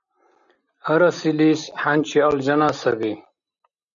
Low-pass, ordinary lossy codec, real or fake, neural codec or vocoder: 7.2 kHz; MP3, 64 kbps; real; none